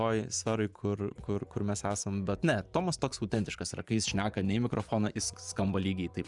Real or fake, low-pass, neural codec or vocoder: fake; 10.8 kHz; vocoder, 24 kHz, 100 mel bands, Vocos